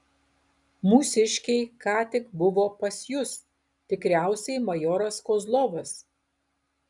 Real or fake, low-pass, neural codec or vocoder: real; 10.8 kHz; none